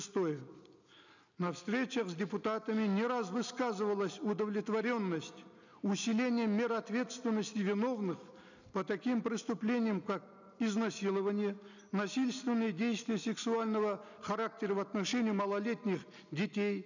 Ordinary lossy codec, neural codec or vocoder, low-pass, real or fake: none; none; 7.2 kHz; real